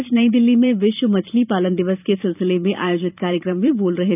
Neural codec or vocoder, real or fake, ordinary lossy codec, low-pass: vocoder, 44.1 kHz, 128 mel bands every 512 samples, BigVGAN v2; fake; none; 3.6 kHz